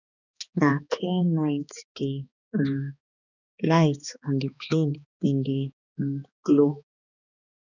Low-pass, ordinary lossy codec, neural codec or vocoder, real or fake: 7.2 kHz; none; codec, 16 kHz, 2 kbps, X-Codec, HuBERT features, trained on balanced general audio; fake